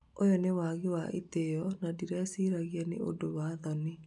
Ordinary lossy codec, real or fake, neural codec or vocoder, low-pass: none; real; none; 10.8 kHz